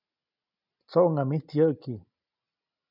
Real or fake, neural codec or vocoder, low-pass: real; none; 5.4 kHz